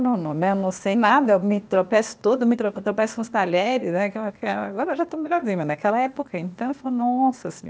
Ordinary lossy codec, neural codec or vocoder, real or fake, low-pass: none; codec, 16 kHz, 0.8 kbps, ZipCodec; fake; none